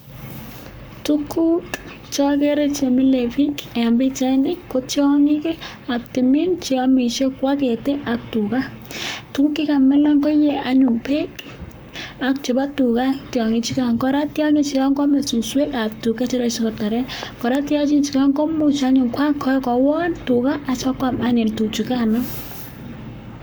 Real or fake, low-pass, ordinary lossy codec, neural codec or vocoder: fake; none; none; codec, 44.1 kHz, 7.8 kbps, Pupu-Codec